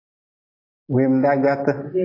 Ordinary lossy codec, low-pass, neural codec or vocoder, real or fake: AAC, 24 kbps; 5.4 kHz; none; real